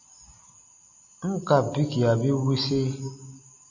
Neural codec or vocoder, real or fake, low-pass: none; real; 7.2 kHz